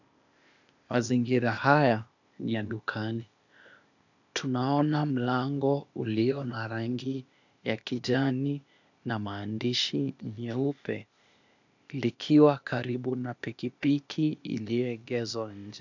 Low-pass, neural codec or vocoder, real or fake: 7.2 kHz; codec, 16 kHz, 0.8 kbps, ZipCodec; fake